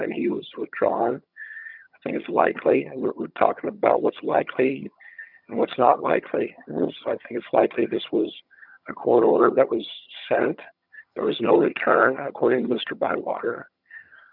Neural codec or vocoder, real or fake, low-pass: vocoder, 22.05 kHz, 80 mel bands, HiFi-GAN; fake; 5.4 kHz